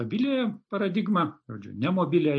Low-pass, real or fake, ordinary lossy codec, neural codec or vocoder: 9.9 kHz; real; AAC, 64 kbps; none